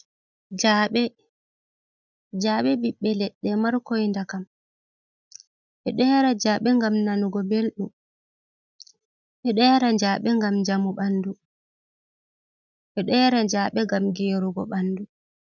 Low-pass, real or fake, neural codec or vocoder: 7.2 kHz; real; none